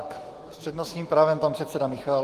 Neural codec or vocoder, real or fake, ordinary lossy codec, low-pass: autoencoder, 48 kHz, 128 numbers a frame, DAC-VAE, trained on Japanese speech; fake; Opus, 24 kbps; 14.4 kHz